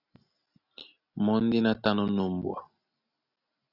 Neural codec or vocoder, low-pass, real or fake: none; 5.4 kHz; real